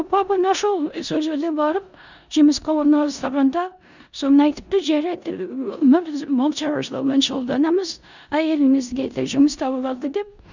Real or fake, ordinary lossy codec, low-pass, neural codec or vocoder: fake; none; 7.2 kHz; codec, 16 kHz in and 24 kHz out, 0.9 kbps, LongCat-Audio-Codec, four codebook decoder